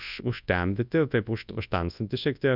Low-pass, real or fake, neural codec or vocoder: 5.4 kHz; fake; codec, 24 kHz, 0.9 kbps, WavTokenizer, large speech release